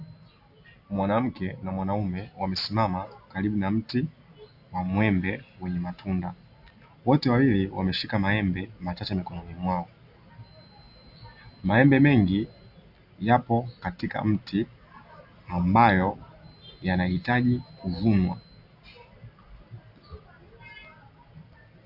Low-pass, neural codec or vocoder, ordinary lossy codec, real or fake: 5.4 kHz; none; AAC, 48 kbps; real